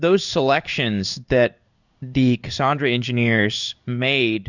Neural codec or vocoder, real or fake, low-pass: codec, 16 kHz in and 24 kHz out, 1 kbps, XY-Tokenizer; fake; 7.2 kHz